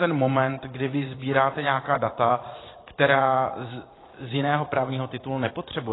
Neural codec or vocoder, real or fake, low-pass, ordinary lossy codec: vocoder, 44.1 kHz, 80 mel bands, Vocos; fake; 7.2 kHz; AAC, 16 kbps